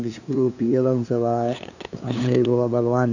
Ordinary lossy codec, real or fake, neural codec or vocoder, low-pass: none; fake; codec, 16 kHz, 2 kbps, FunCodec, trained on LibriTTS, 25 frames a second; 7.2 kHz